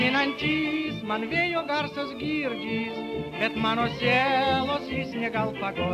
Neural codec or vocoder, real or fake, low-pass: none; real; 14.4 kHz